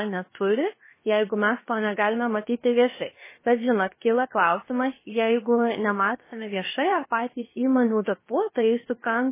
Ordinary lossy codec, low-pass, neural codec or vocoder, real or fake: MP3, 16 kbps; 3.6 kHz; codec, 16 kHz, about 1 kbps, DyCAST, with the encoder's durations; fake